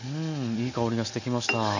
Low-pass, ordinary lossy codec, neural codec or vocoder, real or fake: 7.2 kHz; none; none; real